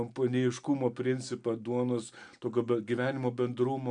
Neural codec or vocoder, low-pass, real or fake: none; 9.9 kHz; real